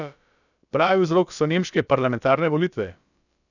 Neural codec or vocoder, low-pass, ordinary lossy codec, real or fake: codec, 16 kHz, about 1 kbps, DyCAST, with the encoder's durations; 7.2 kHz; none; fake